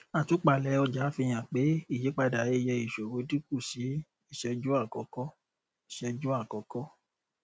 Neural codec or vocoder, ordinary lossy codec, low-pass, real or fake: none; none; none; real